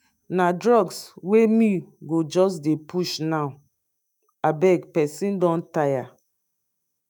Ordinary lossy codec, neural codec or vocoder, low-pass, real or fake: none; autoencoder, 48 kHz, 128 numbers a frame, DAC-VAE, trained on Japanese speech; none; fake